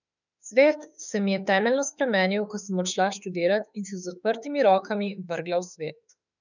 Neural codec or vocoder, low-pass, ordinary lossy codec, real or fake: autoencoder, 48 kHz, 32 numbers a frame, DAC-VAE, trained on Japanese speech; 7.2 kHz; none; fake